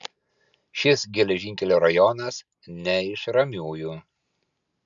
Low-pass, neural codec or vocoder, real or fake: 7.2 kHz; none; real